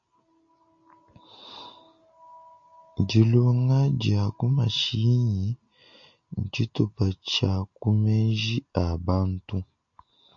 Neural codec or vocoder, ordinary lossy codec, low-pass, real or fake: none; MP3, 48 kbps; 7.2 kHz; real